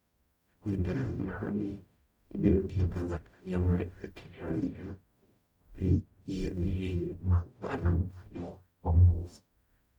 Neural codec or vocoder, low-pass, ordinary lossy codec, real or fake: codec, 44.1 kHz, 0.9 kbps, DAC; 19.8 kHz; none; fake